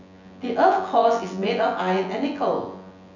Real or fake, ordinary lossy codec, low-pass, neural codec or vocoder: fake; none; 7.2 kHz; vocoder, 24 kHz, 100 mel bands, Vocos